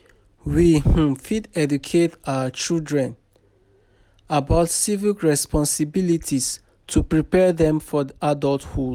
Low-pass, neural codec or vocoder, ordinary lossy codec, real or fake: 19.8 kHz; none; none; real